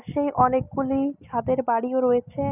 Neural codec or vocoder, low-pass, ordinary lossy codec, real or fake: none; 3.6 kHz; none; real